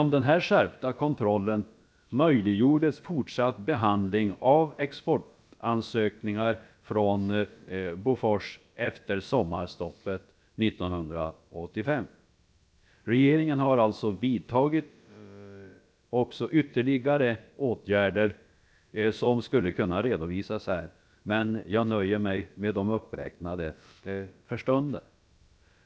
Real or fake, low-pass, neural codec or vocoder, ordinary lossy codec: fake; none; codec, 16 kHz, about 1 kbps, DyCAST, with the encoder's durations; none